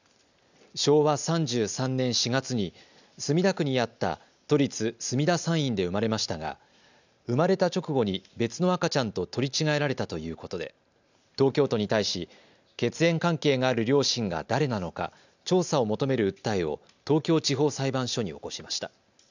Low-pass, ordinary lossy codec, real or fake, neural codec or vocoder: 7.2 kHz; none; real; none